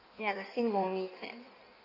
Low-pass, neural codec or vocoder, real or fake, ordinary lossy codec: 5.4 kHz; codec, 16 kHz in and 24 kHz out, 1.1 kbps, FireRedTTS-2 codec; fake; none